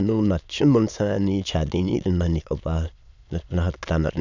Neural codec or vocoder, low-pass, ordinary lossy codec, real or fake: autoencoder, 22.05 kHz, a latent of 192 numbers a frame, VITS, trained on many speakers; 7.2 kHz; none; fake